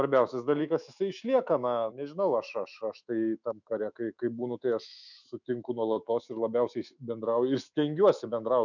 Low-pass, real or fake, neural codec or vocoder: 7.2 kHz; fake; autoencoder, 48 kHz, 128 numbers a frame, DAC-VAE, trained on Japanese speech